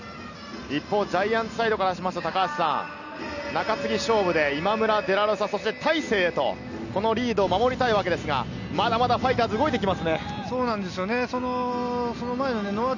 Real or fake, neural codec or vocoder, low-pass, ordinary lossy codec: real; none; 7.2 kHz; none